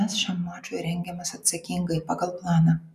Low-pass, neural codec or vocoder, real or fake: 14.4 kHz; none; real